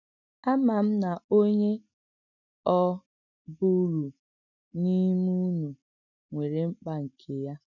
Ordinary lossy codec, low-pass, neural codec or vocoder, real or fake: none; 7.2 kHz; none; real